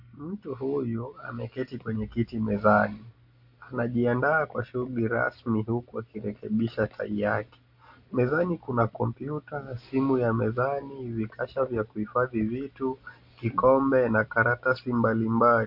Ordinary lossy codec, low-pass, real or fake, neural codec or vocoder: AAC, 48 kbps; 5.4 kHz; real; none